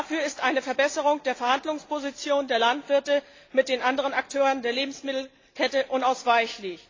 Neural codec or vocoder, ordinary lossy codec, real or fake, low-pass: none; AAC, 32 kbps; real; 7.2 kHz